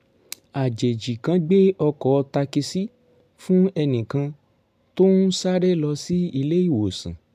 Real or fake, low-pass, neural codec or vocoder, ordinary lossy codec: real; 14.4 kHz; none; none